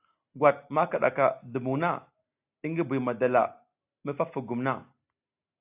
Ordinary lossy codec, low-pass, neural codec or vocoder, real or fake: AAC, 32 kbps; 3.6 kHz; none; real